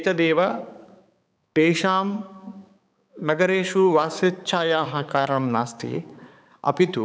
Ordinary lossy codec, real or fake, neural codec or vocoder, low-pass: none; fake; codec, 16 kHz, 4 kbps, X-Codec, HuBERT features, trained on balanced general audio; none